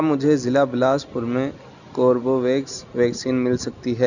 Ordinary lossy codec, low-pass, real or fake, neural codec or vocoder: none; 7.2 kHz; real; none